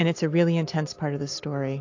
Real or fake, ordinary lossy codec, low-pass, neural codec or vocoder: real; AAC, 48 kbps; 7.2 kHz; none